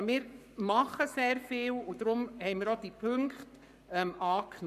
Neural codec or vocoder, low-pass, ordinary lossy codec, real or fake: codec, 44.1 kHz, 7.8 kbps, Pupu-Codec; 14.4 kHz; none; fake